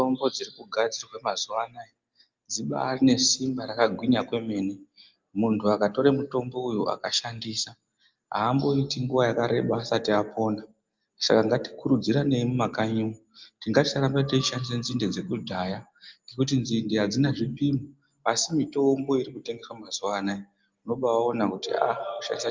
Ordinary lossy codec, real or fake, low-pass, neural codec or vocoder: Opus, 24 kbps; real; 7.2 kHz; none